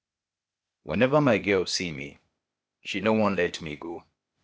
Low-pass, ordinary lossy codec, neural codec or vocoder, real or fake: none; none; codec, 16 kHz, 0.8 kbps, ZipCodec; fake